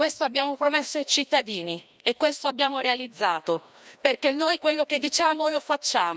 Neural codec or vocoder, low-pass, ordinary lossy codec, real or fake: codec, 16 kHz, 1 kbps, FreqCodec, larger model; none; none; fake